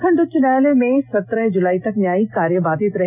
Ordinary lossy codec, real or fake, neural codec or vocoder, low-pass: none; real; none; 3.6 kHz